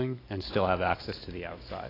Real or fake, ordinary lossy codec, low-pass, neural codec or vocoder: real; AAC, 24 kbps; 5.4 kHz; none